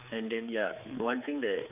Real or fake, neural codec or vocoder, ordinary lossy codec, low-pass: fake; codec, 16 kHz, 4 kbps, X-Codec, HuBERT features, trained on general audio; none; 3.6 kHz